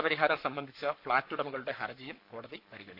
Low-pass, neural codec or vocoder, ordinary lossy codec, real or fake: 5.4 kHz; codec, 44.1 kHz, 7.8 kbps, Pupu-Codec; none; fake